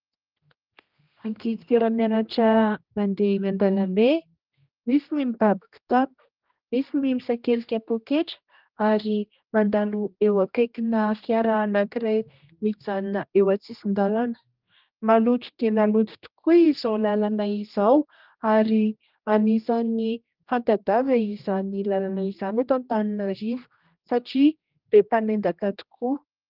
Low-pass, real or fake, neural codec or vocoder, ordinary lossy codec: 5.4 kHz; fake; codec, 16 kHz, 1 kbps, X-Codec, HuBERT features, trained on general audio; Opus, 24 kbps